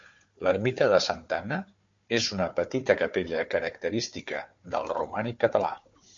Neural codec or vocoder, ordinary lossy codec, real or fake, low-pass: codec, 16 kHz, 4 kbps, FunCodec, trained on Chinese and English, 50 frames a second; MP3, 48 kbps; fake; 7.2 kHz